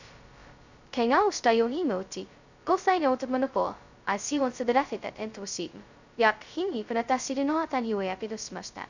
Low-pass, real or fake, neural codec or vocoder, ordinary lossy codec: 7.2 kHz; fake; codec, 16 kHz, 0.2 kbps, FocalCodec; none